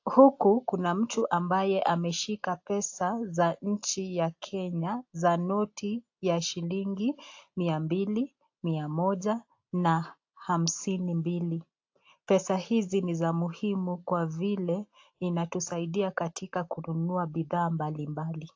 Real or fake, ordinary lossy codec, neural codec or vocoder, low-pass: real; AAC, 48 kbps; none; 7.2 kHz